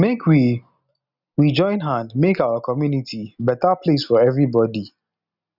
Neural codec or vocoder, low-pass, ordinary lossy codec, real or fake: none; 5.4 kHz; none; real